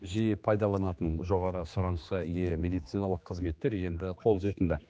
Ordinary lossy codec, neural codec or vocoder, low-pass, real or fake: none; codec, 16 kHz, 2 kbps, X-Codec, HuBERT features, trained on general audio; none; fake